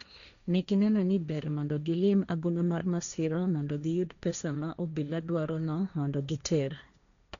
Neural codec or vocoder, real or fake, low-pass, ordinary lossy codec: codec, 16 kHz, 1.1 kbps, Voila-Tokenizer; fake; 7.2 kHz; none